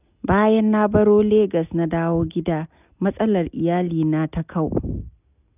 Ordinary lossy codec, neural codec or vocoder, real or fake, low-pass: none; none; real; 3.6 kHz